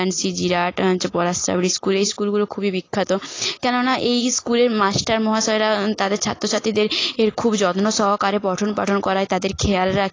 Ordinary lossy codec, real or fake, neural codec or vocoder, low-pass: AAC, 32 kbps; real; none; 7.2 kHz